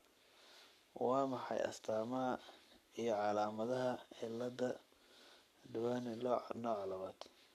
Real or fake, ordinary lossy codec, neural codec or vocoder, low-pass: fake; none; codec, 44.1 kHz, 7.8 kbps, Pupu-Codec; 14.4 kHz